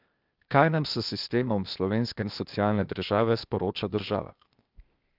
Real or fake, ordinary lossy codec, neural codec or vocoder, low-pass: fake; Opus, 24 kbps; codec, 16 kHz, 0.8 kbps, ZipCodec; 5.4 kHz